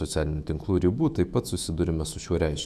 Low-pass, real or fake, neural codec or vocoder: 14.4 kHz; fake; autoencoder, 48 kHz, 128 numbers a frame, DAC-VAE, trained on Japanese speech